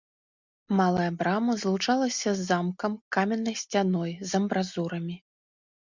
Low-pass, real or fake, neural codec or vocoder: 7.2 kHz; real; none